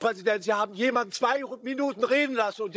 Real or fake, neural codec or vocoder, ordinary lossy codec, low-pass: fake; codec, 16 kHz, 16 kbps, FunCodec, trained on Chinese and English, 50 frames a second; none; none